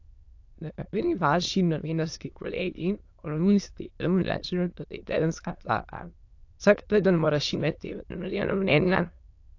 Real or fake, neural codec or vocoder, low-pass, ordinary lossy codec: fake; autoencoder, 22.05 kHz, a latent of 192 numbers a frame, VITS, trained on many speakers; 7.2 kHz; AAC, 48 kbps